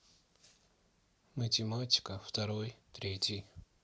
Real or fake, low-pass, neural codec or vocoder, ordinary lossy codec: real; none; none; none